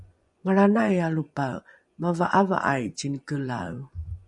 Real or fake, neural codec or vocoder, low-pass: fake; vocoder, 24 kHz, 100 mel bands, Vocos; 10.8 kHz